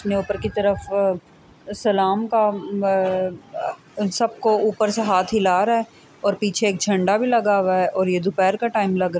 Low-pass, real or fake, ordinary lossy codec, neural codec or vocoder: none; real; none; none